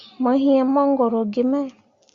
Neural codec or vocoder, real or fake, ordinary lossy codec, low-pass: none; real; Opus, 64 kbps; 7.2 kHz